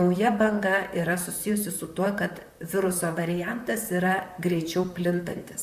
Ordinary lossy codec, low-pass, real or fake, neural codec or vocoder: AAC, 96 kbps; 14.4 kHz; fake; vocoder, 44.1 kHz, 128 mel bands, Pupu-Vocoder